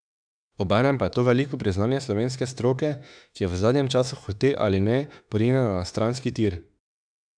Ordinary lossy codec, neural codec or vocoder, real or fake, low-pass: none; autoencoder, 48 kHz, 32 numbers a frame, DAC-VAE, trained on Japanese speech; fake; 9.9 kHz